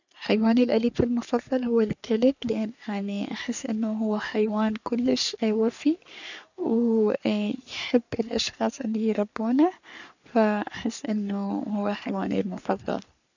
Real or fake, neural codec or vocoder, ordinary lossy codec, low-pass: fake; codec, 44.1 kHz, 3.4 kbps, Pupu-Codec; none; 7.2 kHz